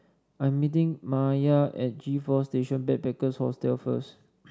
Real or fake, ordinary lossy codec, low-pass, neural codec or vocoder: real; none; none; none